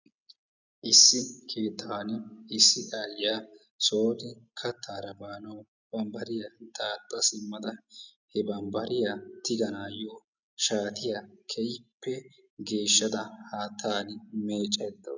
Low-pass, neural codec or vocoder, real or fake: 7.2 kHz; none; real